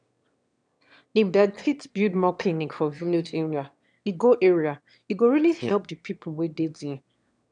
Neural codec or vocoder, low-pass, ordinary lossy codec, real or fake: autoencoder, 22.05 kHz, a latent of 192 numbers a frame, VITS, trained on one speaker; 9.9 kHz; none; fake